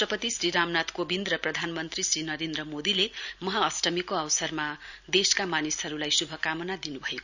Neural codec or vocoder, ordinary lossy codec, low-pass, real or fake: none; none; 7.2 kHz; real